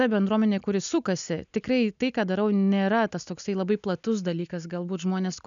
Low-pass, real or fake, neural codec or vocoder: 7.2 kHz; real; none